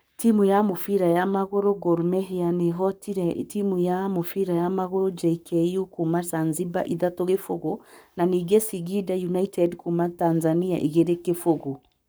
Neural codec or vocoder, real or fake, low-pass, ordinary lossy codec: codec, 44.1 kHz, 7.8 kbps, Pupu-Codec; fake; none; none